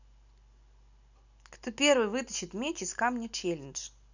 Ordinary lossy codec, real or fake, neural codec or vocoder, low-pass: none; real; none; 7.2 kHz